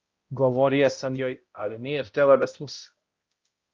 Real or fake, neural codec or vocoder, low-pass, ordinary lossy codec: fake; codec, 16 kHz, 0.5 kbps, X-Codec, HuBERT features, trained on balanced general audio; 7.2 kHz; Opus, 24 kbps